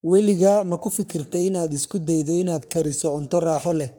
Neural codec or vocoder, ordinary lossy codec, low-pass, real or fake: codec, 44.1 kHz, 3.4 kbps, Pupu-Codec; none; none; fake